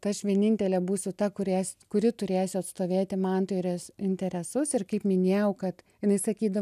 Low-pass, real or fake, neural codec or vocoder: 14.4 kHz; real; none